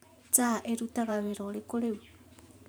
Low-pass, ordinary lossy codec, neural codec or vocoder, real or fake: none; none; vocoder, 44.1 kHz, 128 mel bands every 512 samples, BigVGAN v2; fake